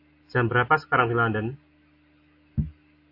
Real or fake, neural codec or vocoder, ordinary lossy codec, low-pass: real; none; AAC, 32 kbps; 5.4 kHz